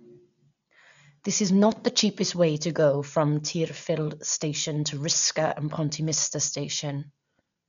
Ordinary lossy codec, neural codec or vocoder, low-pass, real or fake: none; none; 7.2 kHz; real